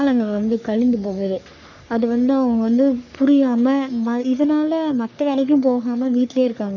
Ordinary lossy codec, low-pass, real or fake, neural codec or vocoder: none; 7.2 kHz; fake; codec, 44.1 kHz, 3.4 kbps, Pupu-Codec